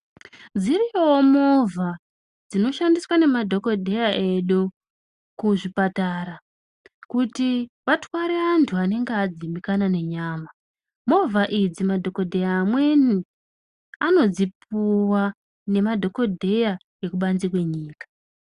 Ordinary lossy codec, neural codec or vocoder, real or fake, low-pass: Opus, 64 kbps; none; real; 10.8 kHz